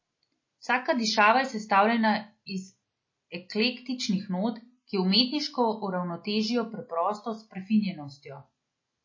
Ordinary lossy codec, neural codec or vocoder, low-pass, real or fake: MP3, 32 kbps; none; 7.2 kHz; real